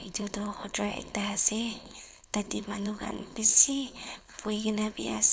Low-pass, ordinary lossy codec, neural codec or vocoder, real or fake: none; none; codec, 16 kHz, 4.8 kbps, FACodec; fake